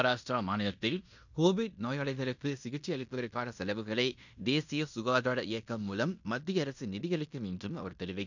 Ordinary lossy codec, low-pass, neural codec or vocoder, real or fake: none; 7.2 kHz; codec, 16 kHz in and 24 kHz out, 0.9 kbps, LongCat-Audio-Codec, fine tuned four codebook decoder; fake